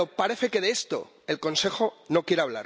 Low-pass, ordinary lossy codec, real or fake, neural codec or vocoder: none; none; real; none